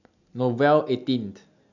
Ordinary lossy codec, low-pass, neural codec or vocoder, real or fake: none; 7.2 kHz; none; real